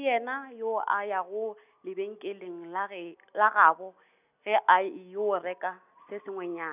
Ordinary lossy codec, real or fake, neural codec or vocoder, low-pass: none; real; none; 3.6 kHz